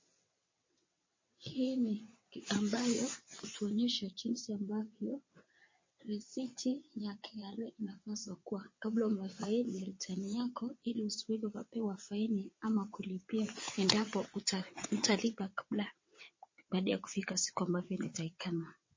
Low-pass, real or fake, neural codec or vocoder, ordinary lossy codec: 7.2 kHz; fake; vocoder, 22.05 kHz, 80 mel bands, WaveNeXt; MP3, 32 kbps